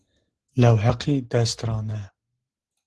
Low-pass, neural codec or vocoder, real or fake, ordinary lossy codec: 10.8 kHz; none; real; Opus, 16 kbps